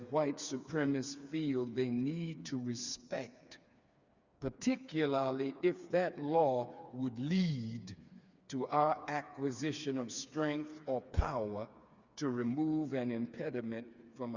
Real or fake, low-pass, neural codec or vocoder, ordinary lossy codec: fake; 7.2 kHz; codec, 16 kHz, 4 kbps, FreqCodec, smaller model; Opus, 64 kbps